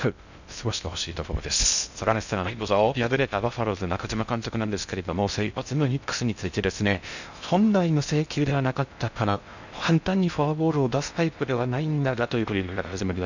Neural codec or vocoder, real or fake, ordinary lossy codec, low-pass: codec, 16 kHz in and 24 kHz out, 0.6 kbps, FocalCodec, streaming, 2048 codes; fake; none; 7.2 kHz